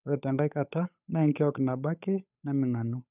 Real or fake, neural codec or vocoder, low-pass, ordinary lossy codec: fake; codec, 16 kHz, 8 kbps, FunCodec, trained on Chinese and English, 25 frames a second; 3.6 kHz; none